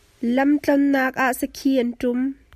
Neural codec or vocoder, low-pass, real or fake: none; 14.4 kHz; real